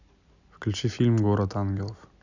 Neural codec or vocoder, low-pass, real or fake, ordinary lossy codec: none; 7.2 kHz; real; none